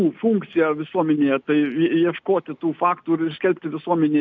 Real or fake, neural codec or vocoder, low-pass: real; none; 7.2 kHz